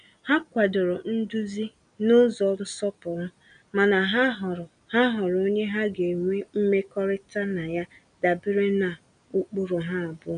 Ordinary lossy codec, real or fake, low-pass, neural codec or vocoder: none; real; 9.9 kHz; none